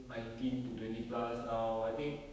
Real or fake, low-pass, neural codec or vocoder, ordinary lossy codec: fake; none; codec, 16 kHz, 6 kbps, DAC; none